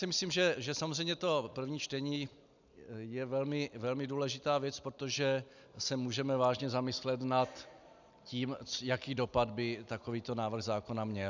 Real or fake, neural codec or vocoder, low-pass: real; none; 7.2 kHz